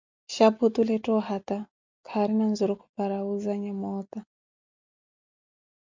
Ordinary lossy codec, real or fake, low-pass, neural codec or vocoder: MP3, 64 kbps; real; 7.2 kHz; none